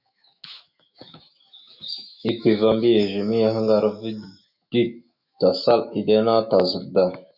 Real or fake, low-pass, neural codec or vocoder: fake; 5.4 kHz; autoencoder, 48 kHz, 128 numbers a frame, DAC-VAE, trained on Japanese speech